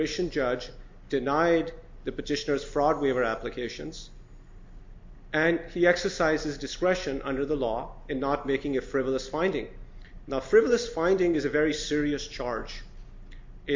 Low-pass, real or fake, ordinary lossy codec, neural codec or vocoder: 7.2 kHz; real; MP3, 48 kbps; none